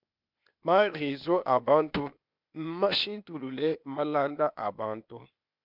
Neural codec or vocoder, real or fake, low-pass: codec, 16 kHz, 0.8 kbps, ZipCodec; fake; 5.4 kHz